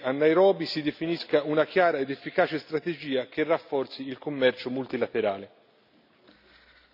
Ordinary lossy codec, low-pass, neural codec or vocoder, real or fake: none; 5.4 kHz; none; real